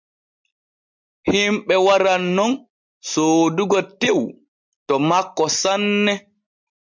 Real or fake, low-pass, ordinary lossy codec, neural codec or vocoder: real; 7.2 kHz; MP3, 64 kbps; none